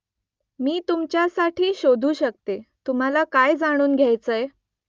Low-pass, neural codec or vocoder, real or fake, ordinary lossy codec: 7.2 kHz; none; real; Opus, 24 kbps